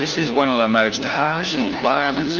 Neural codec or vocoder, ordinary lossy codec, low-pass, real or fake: codec, 16 kHz, 1 kbps, FunCodec, trained on LibriTTS, 50 frames a second; Opus, 32 kbps; 7.2 kHz; fake